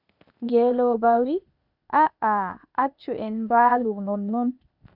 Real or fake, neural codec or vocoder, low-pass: fake; codec, 16 kHz, 0.8 kbps, ZipCodec; 5.4 kHz